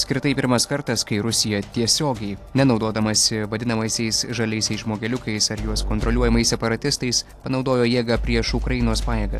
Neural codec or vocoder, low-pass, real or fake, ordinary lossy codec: none; 14.4 kHz; real; AAC, 64 kbps